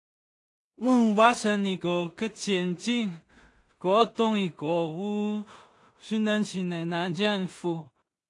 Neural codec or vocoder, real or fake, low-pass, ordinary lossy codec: codec, 16 kHz in and 24 kHz out, 0.4 kbps, LongCat-Audio-Codec, two codebook decoder; fake; 10.8 kHz; AAC, 48 kbps